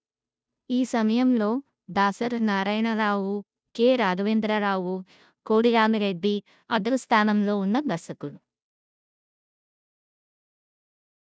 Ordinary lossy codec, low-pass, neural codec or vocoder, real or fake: none; none; codec, 16 kHz, 0.5 kbps, FunCodec, trained on Chinese and English, 25 frames a second; fake